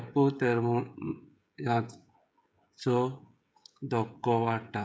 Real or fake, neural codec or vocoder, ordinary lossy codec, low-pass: fake; codec, 16 kHz, 16 kbps, FreqCodec, smaller model; none; none